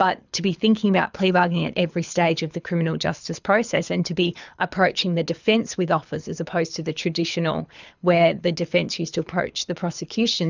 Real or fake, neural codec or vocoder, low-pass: fake; codec, 24 kHz, 6 kbps, HILCodec; 7.2 kHz